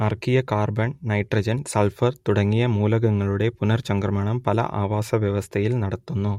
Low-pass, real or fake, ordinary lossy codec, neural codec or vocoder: 19.8 kHz; real; MP3, 64 kbps; none